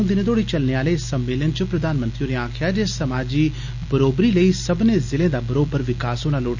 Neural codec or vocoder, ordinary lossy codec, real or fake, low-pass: none; none; real; 7.2 kHz